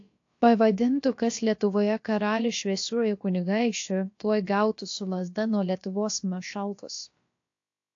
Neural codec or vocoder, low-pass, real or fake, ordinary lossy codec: codec, 16 kHz, about 1 kbps, DyCAST, with the encoder's durations; 7.2 kHz; fake; AAC, 48 kbps